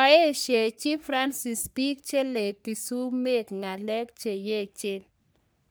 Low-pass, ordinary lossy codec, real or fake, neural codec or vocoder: none; none; fake; codec, 44.1 kHz, 3.4 kbps, Pupu-Codec